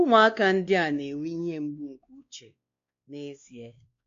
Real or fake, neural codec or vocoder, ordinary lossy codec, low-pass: fake; codec, 16 kHz, 2 kbps, X-Codec, WavLM features, trained on Multilingual LibriSpeech; MP3, 48 kbps; 7.2 kHz